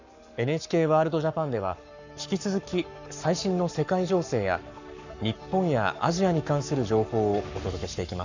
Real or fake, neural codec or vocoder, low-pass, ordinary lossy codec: fake; codec, 44.1 kHz, 7.8 kbps, Pupu-Codec; 7.2 kHz; none